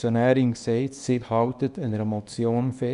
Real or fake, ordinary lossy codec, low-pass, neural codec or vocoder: fake; none; 10.8 kHz; codec, 24 kHz, 0.9 kbps, WavTokenizer, small release